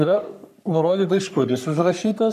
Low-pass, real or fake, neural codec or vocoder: 14.4 kHz; fake; codec, 44.1 kHz, 3.4 kbps, Pupu-Codec